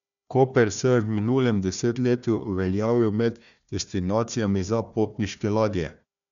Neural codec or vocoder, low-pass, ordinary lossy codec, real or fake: codec, 16 kHz, 1 kbps, FunCodec, trained on Chinese and English, 50 frames a second; 7.2 kHz; none; fake